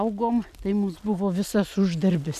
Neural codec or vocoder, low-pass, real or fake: none; 14.4 kHz; real